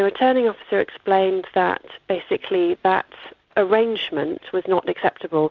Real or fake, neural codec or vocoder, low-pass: real; none; 7.2 kHz